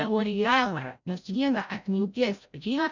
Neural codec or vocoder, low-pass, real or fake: codec, 16 kHz, 0.5 kbps, FreqCodec, larger model; 7.2 kHz; fake